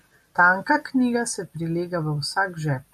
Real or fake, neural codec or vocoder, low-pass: real; none; 14.4 kHz